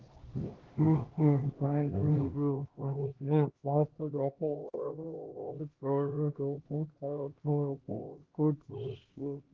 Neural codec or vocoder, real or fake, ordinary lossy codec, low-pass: codec, 16 kHz, 1 kbps, X-Codec, HuBERT features, trained on LibriSpeech; fake; Opus, 16 kbps; 7.2 kHz